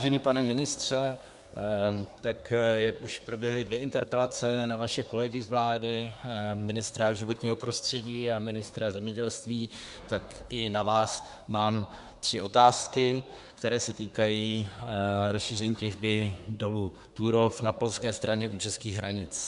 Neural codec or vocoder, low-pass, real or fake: codec, 24 kHz, 1 kbps, SNAC; 10.8 kHz; fake